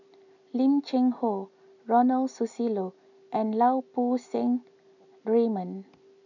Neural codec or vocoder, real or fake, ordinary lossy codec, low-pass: none; real; none; 7.2 kHz